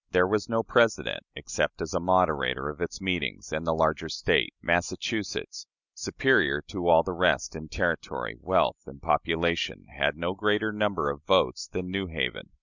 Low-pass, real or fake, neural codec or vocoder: 7.2 kHz; real; none